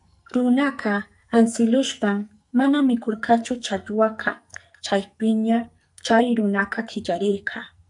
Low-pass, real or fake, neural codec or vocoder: 10.8 kHz; fake; codec, 44.1 kHz, 2.6 kbps, SNAC